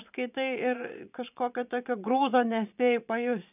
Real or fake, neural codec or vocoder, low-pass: fake; vocoder, 22.05 kHz, 80 mel bands, Vocos; 3.6 kHz